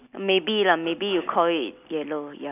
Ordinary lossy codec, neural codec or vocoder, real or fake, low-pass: none; none; real; 3.6 kHz